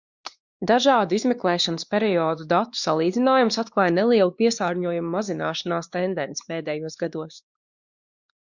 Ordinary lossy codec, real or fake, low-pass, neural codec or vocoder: Opus, 64 kbps; fake; 7.2 kHz; codec, 16 kHz, 2 kbps, X-Codec, WavLM features, trained on Multilingual LibriSpeech